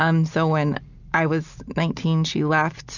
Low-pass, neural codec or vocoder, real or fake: 7.2 kHz; none; real